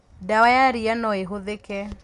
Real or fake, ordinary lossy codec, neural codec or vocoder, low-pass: real; Opus, 64 kbps; none; 10.8 kHz